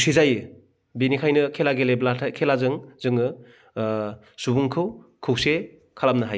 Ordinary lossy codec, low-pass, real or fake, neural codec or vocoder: none; none; real; none